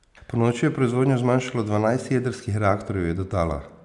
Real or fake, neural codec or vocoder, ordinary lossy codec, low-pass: real; none; none; 10.8 kHz